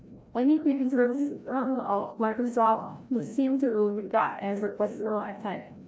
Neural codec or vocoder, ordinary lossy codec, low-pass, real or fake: codec, 16 kHz, 0.5 kbps, FreqCodec, larger model; none; none; fake